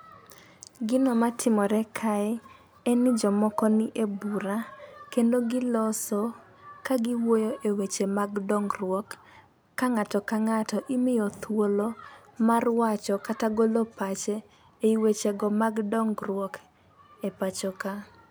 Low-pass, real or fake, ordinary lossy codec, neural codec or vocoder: none; real; none; none